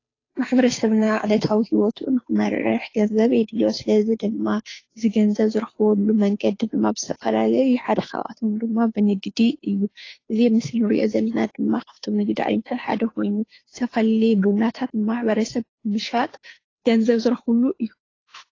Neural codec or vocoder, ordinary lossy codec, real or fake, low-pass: codec, 16 kHz, 2 kbps, FunCodec, trained on Chinese and English, 25 frames a second; AAC, 32 kbps; fake; 7.2 kHz